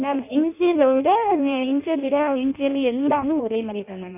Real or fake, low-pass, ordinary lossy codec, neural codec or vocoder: fake; 3.6 kHz; none; codec, 16 kHz in and 24 kHz out, 0.6 kbps, FireRedTTS-2 codec